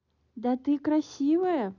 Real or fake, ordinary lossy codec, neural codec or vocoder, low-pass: real; none; none; 7.2 kHz